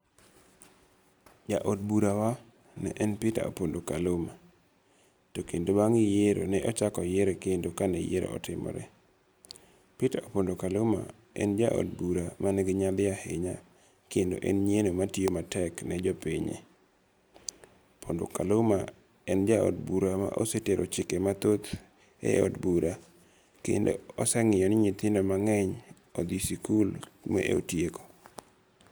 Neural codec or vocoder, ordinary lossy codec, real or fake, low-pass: none; none; real; none